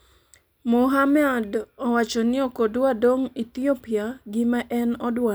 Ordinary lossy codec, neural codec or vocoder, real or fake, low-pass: none; none; real; none